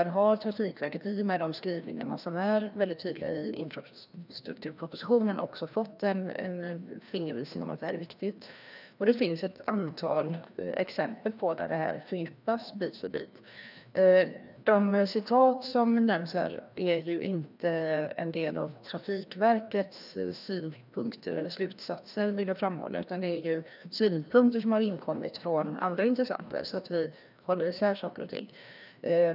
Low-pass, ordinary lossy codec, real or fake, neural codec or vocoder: 5.4 kHz; none; fake; codec, 16 kHz, 1 kbps, FreqCodec, larger model